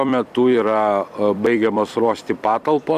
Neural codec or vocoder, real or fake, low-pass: vocoder, 44.1 kHz, 128 mel bands every 256 samples, BigVGAN v2; fake; 14.4 kHz